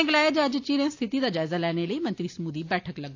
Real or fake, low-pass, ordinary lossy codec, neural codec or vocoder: real; 7.2 kHz; AAC, 48 kbps; none